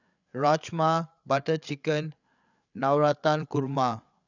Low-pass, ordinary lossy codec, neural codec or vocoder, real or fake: 7.2 kHz; none; codec, 16 kHz, 8 kbps, FreqCodec, larger model; fake